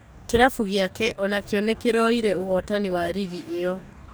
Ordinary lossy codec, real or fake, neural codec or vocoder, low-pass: none; fake; codec, 44.1 kHz, 2.6 kbps, DAC; none